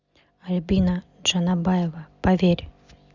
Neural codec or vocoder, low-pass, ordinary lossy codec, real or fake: none; 7.2 kHz; Opus, 64 kbps; real